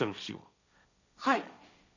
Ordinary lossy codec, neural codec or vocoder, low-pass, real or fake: none; codec, 16 kHz, 1.1 kbps, Voila-Tokenizer; 7.2 kHz; fake